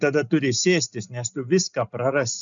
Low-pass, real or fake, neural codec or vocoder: 7.2 kHz; real; none